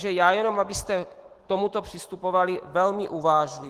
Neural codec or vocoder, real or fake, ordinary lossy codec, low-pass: autoencoder, 48 kHz, 128 numbers a frame, DAC-VAE, trained on Japanese speech; fake; Opus, 16 kbps; 14.4 kHz